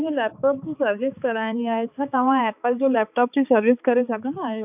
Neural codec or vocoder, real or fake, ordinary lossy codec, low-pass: codec, 16 kHz, 4 kbps, X-Codec, HuBERT features, trained on balanced general audio; fake; none; 3.6 kHz